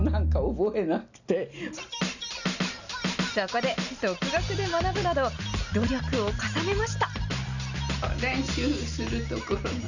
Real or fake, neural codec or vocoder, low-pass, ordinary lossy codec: real; none; 7.2 kHz; none